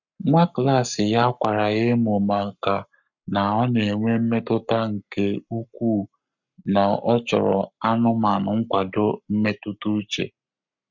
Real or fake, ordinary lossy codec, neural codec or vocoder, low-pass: fake; none; codec, 44.1 kHz, 7.8 kbps, Pupu-Codec; 7.2 kHz